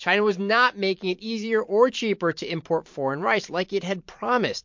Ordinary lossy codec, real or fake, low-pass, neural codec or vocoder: MP3, 48 kbps; fake; 7.2 kHz; vocoder, 44.1 kHz, 128 mel bands every 512 samples, BigVGAN v2